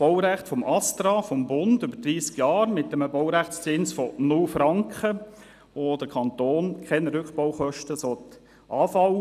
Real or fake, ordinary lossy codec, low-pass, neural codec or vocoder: real; none; 14.4 kHz; none